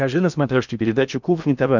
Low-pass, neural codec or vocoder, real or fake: 7.2 kHz; codec, 16 kHz in and 24 kHz out, 0.8 kbps, FocalCodec, streaming, 65536 codes; fake